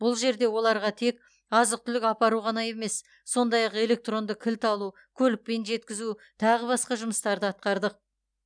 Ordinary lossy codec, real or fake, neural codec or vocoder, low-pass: none; real; none; 9.9 kHz